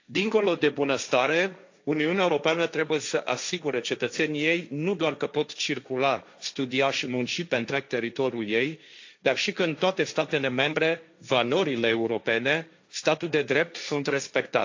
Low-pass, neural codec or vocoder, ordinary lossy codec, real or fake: 7.2 kHz; codec, 16 kHz, 1.1 kbps, Voila-Tokenizer; AAC, 48 kbps; fake